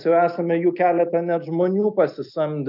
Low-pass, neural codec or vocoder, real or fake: 5.4 kHz; none; real